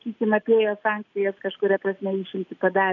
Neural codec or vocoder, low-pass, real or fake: none; 7.2 kHz; real